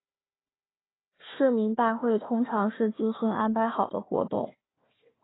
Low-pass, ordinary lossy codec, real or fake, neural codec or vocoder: 7.2 kHz; AAC, 16 kbps; fake; codec, 16 kHz, 1 kbps, FunCodec, trained on Chinese and English, 50 frames a second